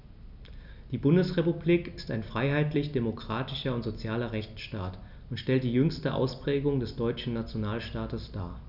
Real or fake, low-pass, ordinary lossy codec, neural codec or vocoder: real; 5.4 kHz; none; none